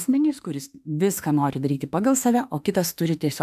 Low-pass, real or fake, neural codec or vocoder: 14.4 kHz; fake; autoencoder, 48 kHz, 32 numbers a frame, DAC-VAE, trained on Japanese speech